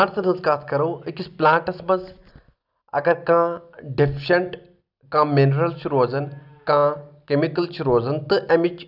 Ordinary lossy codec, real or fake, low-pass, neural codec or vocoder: none; real; 5.4 kHz; none